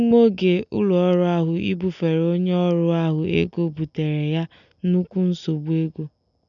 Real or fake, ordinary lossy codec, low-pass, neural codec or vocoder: real; none; 7.2 kHz; none